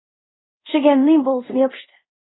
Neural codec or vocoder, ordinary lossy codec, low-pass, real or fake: codec, 24 kHz, 0.5 kbps, DualCodec; AAC, 16 kbps; 7.2 kHz; fake